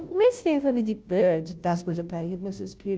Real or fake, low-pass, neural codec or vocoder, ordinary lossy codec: fake; none; codec, 16 kHz, 0.5 kbps, FunCodec, trained on Chinese and English, 25 frames a second; none